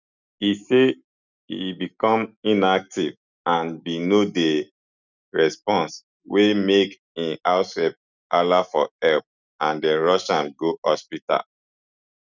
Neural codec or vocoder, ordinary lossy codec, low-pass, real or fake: none; none; 7.2 kHz; real